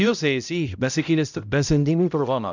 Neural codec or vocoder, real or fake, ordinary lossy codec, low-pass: codec, 16 kHz, 0.5 kbps, X-Codec, HuBERT features, trained on balanced general audio; fake; none; 7.2 kHz